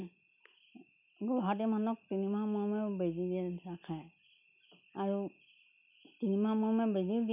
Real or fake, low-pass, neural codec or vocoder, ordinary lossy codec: real; 3.6 kHz; none; none